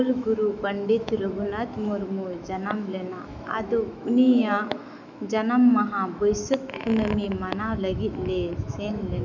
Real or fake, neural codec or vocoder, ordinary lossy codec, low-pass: fake; autoencoder, 48 kHz, 128 numbers a frame, DAC-VAE, trained on Japanese speech; none; 7.2 kHz